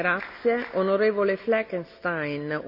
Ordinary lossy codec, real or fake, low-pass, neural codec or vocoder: none; real; 5.4 kHz; none